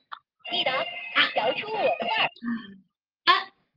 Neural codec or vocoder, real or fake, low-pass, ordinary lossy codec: none; real; 5.4 kHz; Opus, 32 kbps